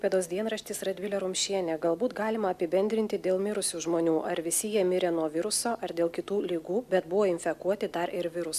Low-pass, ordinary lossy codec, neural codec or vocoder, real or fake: 14.4 kHz; AAC, 96 kbps; none; real